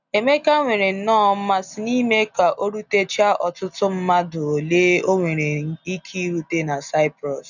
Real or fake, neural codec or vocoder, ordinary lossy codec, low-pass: real; none; none; 7.2 kHz